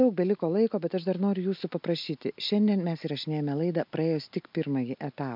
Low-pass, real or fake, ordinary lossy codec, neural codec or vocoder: 5.4 kHz; real; MP3, 48 kbps; none